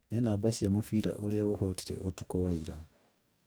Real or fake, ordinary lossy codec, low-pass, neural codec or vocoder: fake; none; none; codec, 44.1 kHz, 2.6 kbps, DAC